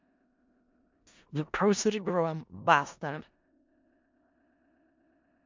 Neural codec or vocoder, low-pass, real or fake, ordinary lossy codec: codec, 16 kHz in and 24 kHz out, 0.4 kbps, LongCat-Audio-Codec, four codebook decoder; 7.2 kHz; fake; MP3, 64 kbps